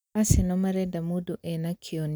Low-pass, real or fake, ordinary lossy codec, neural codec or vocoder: none; real; none; none